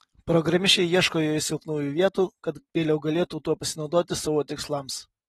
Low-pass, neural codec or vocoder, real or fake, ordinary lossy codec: 19.8 kHz; none; real; AAC, 32 kbps